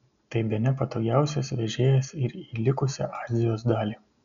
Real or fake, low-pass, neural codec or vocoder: real; 7.2 kHz; none